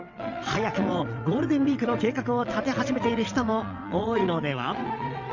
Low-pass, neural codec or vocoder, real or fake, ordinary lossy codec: 7.2 kHz; vocoder, 22.05 kHz, 80 mel bands, WaveNeXt; fake; none